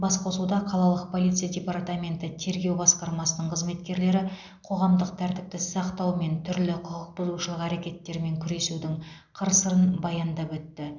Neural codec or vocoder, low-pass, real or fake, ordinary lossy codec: none; 7.2 kHz; real; none